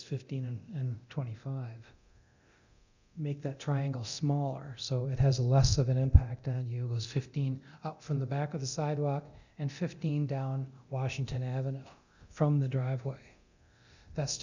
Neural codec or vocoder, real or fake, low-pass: codec, 24 kHz, 0.9 kbps, DualCodec; fake; 7.2 kHz